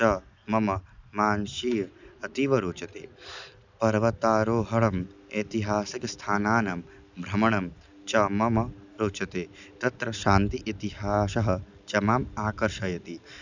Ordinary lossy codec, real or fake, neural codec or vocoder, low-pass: none; real; none; 7.2 kHz